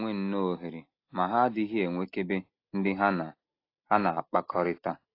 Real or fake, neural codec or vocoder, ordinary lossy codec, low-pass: real; none; AAC, 32 kbps; 5.4 kHz